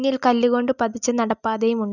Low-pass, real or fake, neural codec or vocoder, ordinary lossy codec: 7.2 kHz; real; none; none